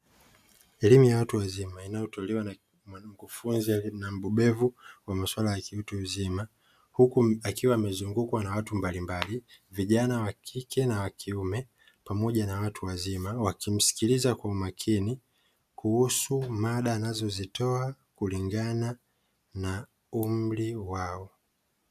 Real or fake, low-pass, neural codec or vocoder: real; 14.4 kHz; none